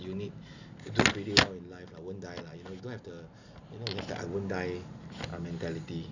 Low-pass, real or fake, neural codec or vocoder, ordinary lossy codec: 7.2 kHz; real; none; none